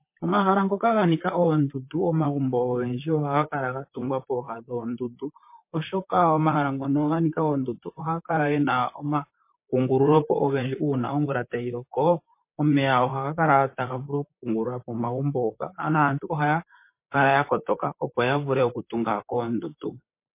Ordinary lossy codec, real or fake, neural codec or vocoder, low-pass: MP3, 24 kbps; fake; vocoder, 44.1 kHz, 128 mel bands, Pupu-Vocoder; 3.6 kHz